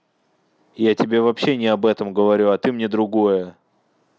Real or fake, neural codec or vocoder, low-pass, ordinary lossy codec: real; none; none; none